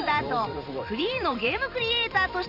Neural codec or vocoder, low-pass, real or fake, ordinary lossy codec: vocoder, 44.1 kHz, 128 mel bands every 512 samples, BigVGAN v2; 5.4 kHz; fake; none